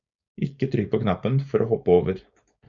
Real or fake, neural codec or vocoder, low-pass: fake; codec, 16 kHz, 4.8 kbps, FACodec; 7.2 kHz